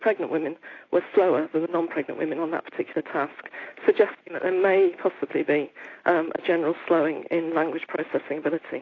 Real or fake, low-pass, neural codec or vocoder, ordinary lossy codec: real; 7.2 kHz; none; AAC, 32 kbps